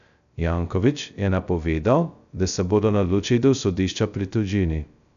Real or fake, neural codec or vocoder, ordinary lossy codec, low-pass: fake; codec, 16 kHz, 0.2 kbps, FocalCodec; none; 7.2 kHz